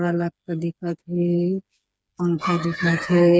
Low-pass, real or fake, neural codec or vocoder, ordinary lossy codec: none; fake; codec, 16 kHz, 4 kbps, FreqCodec, smaller model; none